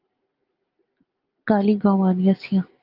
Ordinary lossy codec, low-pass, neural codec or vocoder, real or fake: Opus, 24 kbps; 5.4 kHz; none; real